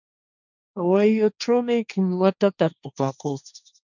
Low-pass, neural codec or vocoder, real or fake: 7.2 kHz; codec, 16 kHz, 1.1 kbps, Voila-Tokenizer; fake